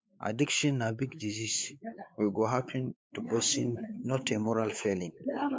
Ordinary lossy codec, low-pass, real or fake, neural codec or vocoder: none; none; fake; codec, 16 kHz, 4 kbps, X-Codec, WavLM features, trained on Multilingual LibriSpeech